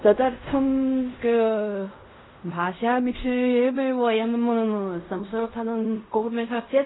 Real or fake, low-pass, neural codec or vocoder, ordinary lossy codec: fake; 7.2 kHz; codec, 16 kHz in and 24 kHz out, 0.4 kbps, LongCat-Audio-Codec, fine tuned four codebook decoder; AAC, 16 kbps